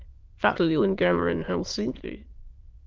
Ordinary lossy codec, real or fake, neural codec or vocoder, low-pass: Opus, 32 kbps; fake; autoencoder, 22.05 kHz, a latent of 192 numbers a frame, VITS, trained on many speakers; 7.2 kHz